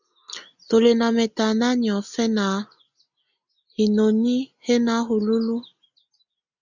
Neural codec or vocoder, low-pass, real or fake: none; 7.2 kHz; real